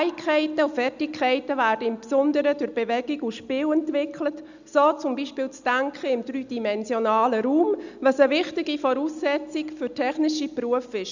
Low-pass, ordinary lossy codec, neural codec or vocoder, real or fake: 7.2 kHz; none; none; real